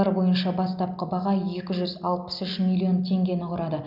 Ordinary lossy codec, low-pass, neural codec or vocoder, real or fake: none; 5.4 kHz; none; real